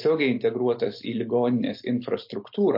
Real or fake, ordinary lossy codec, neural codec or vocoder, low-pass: real; MP3, 32 kbps; none; 5.4 kHz